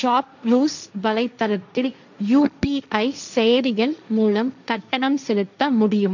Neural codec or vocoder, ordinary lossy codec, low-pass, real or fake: codec, 16 kHz, 1.1 kbps, Voila-Tokenizer; none; 7.2 kHz; fake